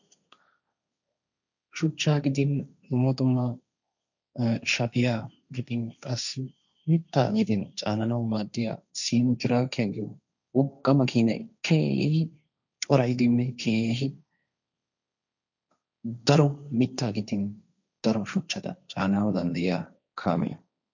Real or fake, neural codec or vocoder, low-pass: fake; codec, 16 kHz, 1.1 kbps, Voila-Tokenizer; 7.2 kHz